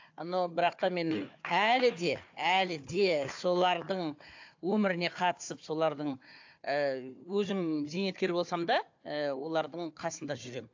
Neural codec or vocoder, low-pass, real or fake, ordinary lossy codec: codec, 16 kHz, 4 kbps, FunCodec, trained on Chinese and English, 50 frames a second; 7.2 kHz; fake; AAC, 48 kbps